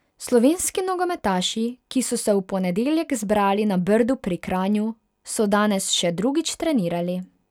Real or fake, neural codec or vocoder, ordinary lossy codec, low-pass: real; none; none; 19.8 kHz